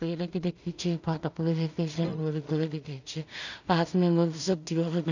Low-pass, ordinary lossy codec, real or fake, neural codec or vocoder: 7.2 kHz; none; fake; codec, 16 kHz in and 24 kHz out, 0.4 kbps, LongCat-Audio-Codec, two codebook decoder